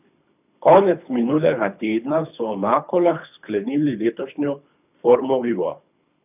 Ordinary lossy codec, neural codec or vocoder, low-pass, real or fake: none; codec, 24 kHz, 3 kbps, HILCodec; 3.6 kHz; fake